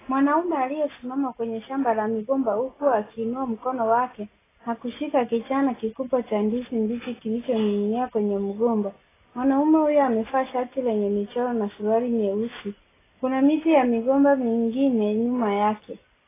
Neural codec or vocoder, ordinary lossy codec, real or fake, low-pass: none; AAC, 16 kbps; real; 3.6 kHz